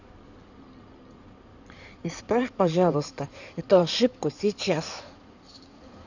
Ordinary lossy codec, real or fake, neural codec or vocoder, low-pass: none; fake; codec, 16 kHz in and 24 kHz out, 2.2 kbps, FireRedTTS-2 codec; 7.2 kHz